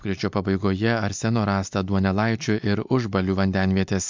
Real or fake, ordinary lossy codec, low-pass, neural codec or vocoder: real; MP3, 64 kbps; 7.2 kHz; none